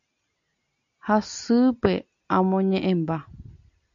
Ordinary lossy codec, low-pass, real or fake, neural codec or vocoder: MP3, 96 kbps; 7.2 kHz; real; none